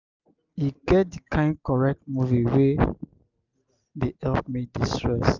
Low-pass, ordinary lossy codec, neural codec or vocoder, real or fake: 7.2 kHz; none; none; real